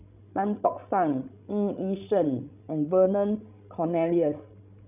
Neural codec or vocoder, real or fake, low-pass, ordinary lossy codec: codec, 16 kHz, 16 kbps, FreqCodec, larger model; fake; 3.6 kHz; none